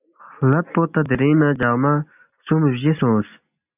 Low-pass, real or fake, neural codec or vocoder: 3.6 kHz; real; none